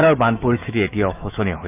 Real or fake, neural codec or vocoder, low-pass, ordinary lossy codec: fake; autoencoder, 48 kHz, 128 numbers a frame, DAC-VAE, trained on Japanese speech; 3.6 kHz; none